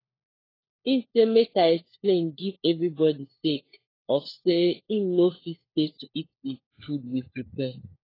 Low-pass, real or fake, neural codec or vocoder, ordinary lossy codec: 5.4 kHz; fake; codec, 16 kHz, 4 kbps, FunCodec, trained on LibriTTS, 50 frames a second; AAC, 24 kbps